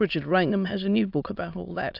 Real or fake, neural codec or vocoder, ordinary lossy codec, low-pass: fake; autoencoder, 22.05 kHz, a latent of 192 numbers a frame, VITS, trained on many speakers; AAC, 48 kbps; 5.4 kHz